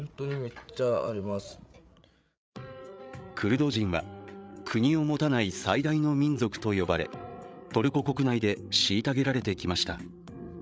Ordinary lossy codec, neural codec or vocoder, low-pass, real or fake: none; codec, 16 kHz, 8 kbps, FreqCodec, larger model; none; fake